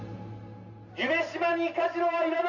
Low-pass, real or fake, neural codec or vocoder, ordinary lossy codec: 7.2 kHz; real; none; none